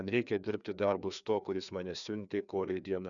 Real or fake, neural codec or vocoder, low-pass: fake; codec, 16 kHz, 2 kbps, FreqCodec, larger model; 7.2 kHz